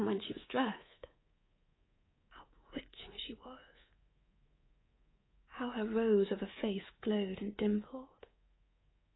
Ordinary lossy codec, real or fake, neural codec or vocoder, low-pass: AAC, 16 kbps; fake; codec, 24 kHz, 3.1 kbps, DualCodec; 7.2 kHz